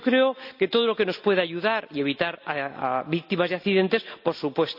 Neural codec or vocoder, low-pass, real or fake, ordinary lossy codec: none; 5.4 kHz; real; AAC, 48 kbps